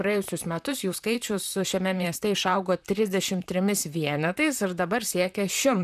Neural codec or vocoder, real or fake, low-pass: vocoder, 44.1 kHz, 128 mel bands, Pupu-Vocoder; fake; 14.4 kHz